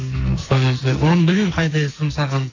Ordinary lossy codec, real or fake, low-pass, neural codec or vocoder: AAC, 32 kbps; fake; 7.2 kHz; codec, 44.1 kHz, 2.6 kbps, SNAC